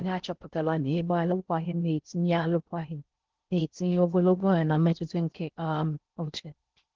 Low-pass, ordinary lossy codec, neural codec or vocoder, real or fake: 7.2 kHz; Opus, 16 kbps; codec, 16 kHz in and 24 kHz out, 0.6 kbps, FocalCodec, streaming, 4096 codes; fake